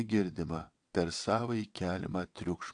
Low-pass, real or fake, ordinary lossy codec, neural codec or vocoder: 9.9 kHz; fake; AAC, 64 kbps; vocoder, 22.05 kHz, 80 mel bands, WaveNeXt